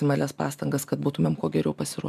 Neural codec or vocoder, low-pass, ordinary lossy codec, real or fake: none; 14.4 kHz; MP3, 96 kbps; real